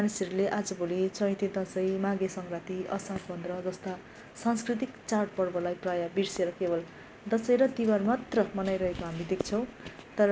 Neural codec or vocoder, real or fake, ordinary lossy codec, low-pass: none; real; none; none